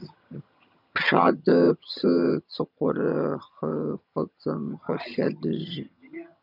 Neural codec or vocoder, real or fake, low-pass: vocoder, 22.05 kHz, 80 mel bands, HiFi-GAN; fake; 5.4 kHz